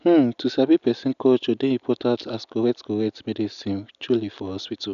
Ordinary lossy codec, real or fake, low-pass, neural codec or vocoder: none; real; 7.2 kHz; none